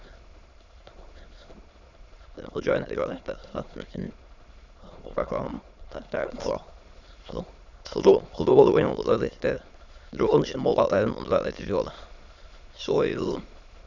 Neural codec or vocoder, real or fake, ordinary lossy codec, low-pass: autoencoder, 22.05 kHz, a latent of 192 numbers a frame, VITS, trained on many speakers; fake; none; 7.2 kHz